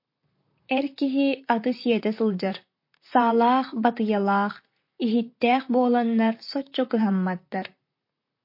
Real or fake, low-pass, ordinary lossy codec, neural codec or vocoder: fake; 5.4 kHz; MP3, 32 kbps; vocoder, 44.1 kHz, 128 mel bands, Pupu-Vocoder